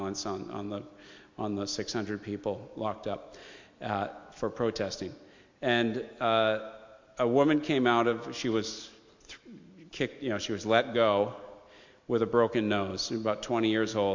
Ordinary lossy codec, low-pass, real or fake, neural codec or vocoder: MP3, 48 kbps; 7.2 kHz; real; none